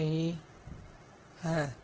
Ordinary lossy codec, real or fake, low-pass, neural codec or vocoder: Opus, 16 kbps; real; 7.2 kHz; none